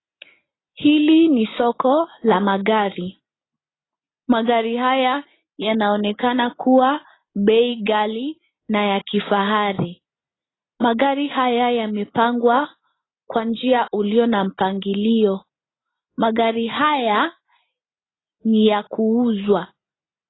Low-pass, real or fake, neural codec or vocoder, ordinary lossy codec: 7.2 kHz; real; none; AAC, 16 kbps